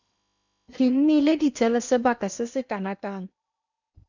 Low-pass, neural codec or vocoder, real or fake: 7.2 kHz; codec, 16 kHz in and 24 kHz out, 0.8 kbps, FocalCodec, streaming, 65536 codes; fake